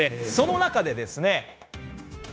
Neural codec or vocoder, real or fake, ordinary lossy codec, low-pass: codec, 16 kHz, 0.9 kbps, LongCat-Audio-Codec; fake; none; none